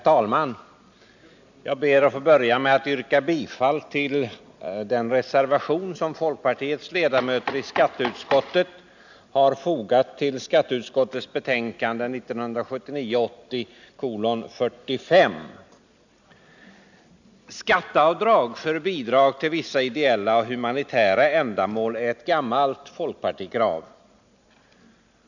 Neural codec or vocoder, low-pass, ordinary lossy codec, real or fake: none; 7.2 kHz; none; real